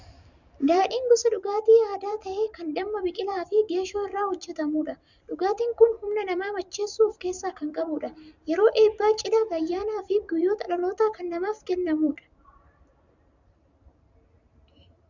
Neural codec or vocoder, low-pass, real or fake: vocoder, 44.1 kHz, 128 mel bands every 512 samples, BigVGAN v2; 7.2 kHz; fake